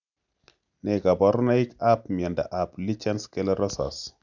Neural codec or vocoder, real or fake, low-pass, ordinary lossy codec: none; real; 7.2 kHz; none